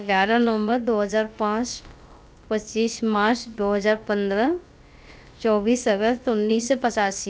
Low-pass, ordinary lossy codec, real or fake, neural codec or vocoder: none; none; fake; codec, 16 kHz, about 1 kbps, DyCAST, with the encoder's durations